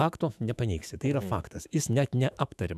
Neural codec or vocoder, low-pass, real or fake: autoencoder, 48 kHz, 128 numbers a frame, DAC-VAE, trained on Japanese speech; 14.4 kHz; fake